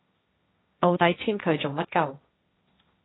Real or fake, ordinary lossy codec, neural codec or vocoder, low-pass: fake; AAC, 16 kbps; codec, 16 kHz, 1.1 kbps, Voila-Tokenizer; 7.2 kHz